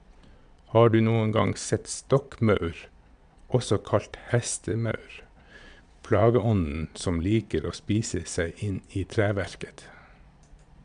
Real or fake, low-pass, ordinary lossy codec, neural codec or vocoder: fake; 9.9 kHz; none; vocoder, 22.05 kHz, 80 mel bands, Vocos